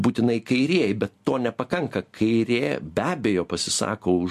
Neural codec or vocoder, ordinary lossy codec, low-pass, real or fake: none; MP3, 64 kbps; 14.4 kHz; real